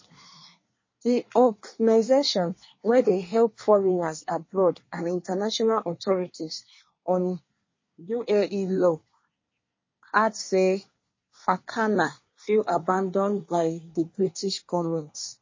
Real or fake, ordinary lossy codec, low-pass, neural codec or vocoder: fake; MP3, 32 kbps; 7.2 kHz; codec, 24 kHz, 1 kbps, SNAC